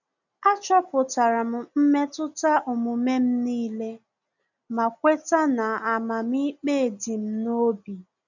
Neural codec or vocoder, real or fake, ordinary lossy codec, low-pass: none; real; none; 7.2 kHz